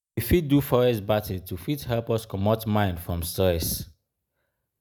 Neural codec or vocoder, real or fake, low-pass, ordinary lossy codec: none; real; none; none